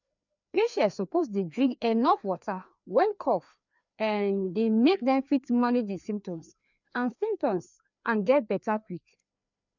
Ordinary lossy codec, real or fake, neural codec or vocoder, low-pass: none; fake; codec, 16 kHz, 2 kbps, FreqCodec, larger model; 7.2 kHz